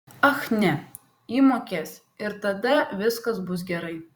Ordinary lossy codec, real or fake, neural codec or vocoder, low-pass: Opus, 64 kbps; fake; vocoder, 44.1 kHz, 128 mel bands every 256 samples, BigVGAN v2; 19.8 kHz